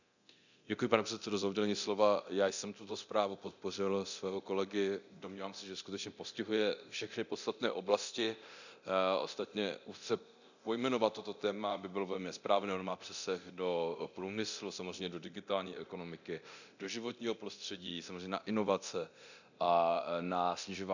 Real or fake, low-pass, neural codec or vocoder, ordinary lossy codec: fake; 7.2 kHz; codec, 24 kHz, 0.9 kbps, DualCodec; none